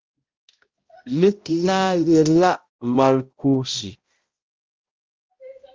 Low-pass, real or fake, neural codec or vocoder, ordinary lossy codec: 7.2 kHz; fake; codec, 16 kHz, 0.5 kbps, X-Codec, HuBERT features, trained on balanced general audio; Opus, 32 kbps